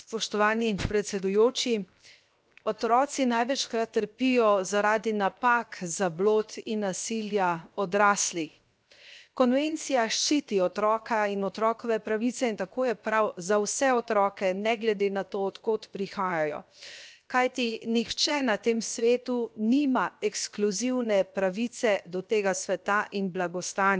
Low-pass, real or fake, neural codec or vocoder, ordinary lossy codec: none; fake; codec, 16 kHz, 0.7 kbps, FocalCodec; none